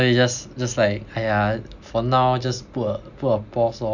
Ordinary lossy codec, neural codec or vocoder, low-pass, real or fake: none; none; 7.2 kHz; real